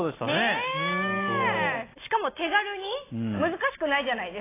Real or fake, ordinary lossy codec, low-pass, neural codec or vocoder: real; AAC, 16 kbps; 3.6 kHz; none